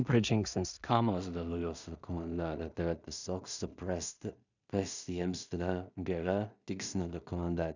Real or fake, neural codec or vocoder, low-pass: fake; codec, 16 kHz in and 24 kHz out, 0.4 kbps, LongCat-Audio-Codec, two codebook decoder; 7.2 kHz